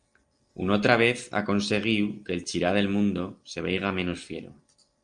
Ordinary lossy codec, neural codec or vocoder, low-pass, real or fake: Opus, 32 kbps; none; 9.9 kHz; real